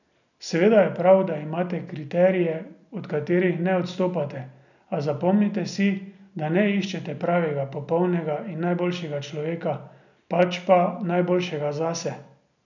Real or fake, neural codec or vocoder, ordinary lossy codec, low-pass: real; none; none; 7.2 kHz